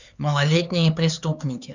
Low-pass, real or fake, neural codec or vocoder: 7.2 kHz; fake; codec, 16 kHz, 4 kbps, X-Codec, HuBERT features, trained on LibriSpeech